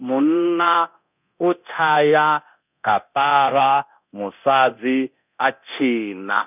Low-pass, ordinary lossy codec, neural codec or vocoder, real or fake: 3.6 kHz; none; codec, 24 kHz, 0.9 kbps, DualCodec; fake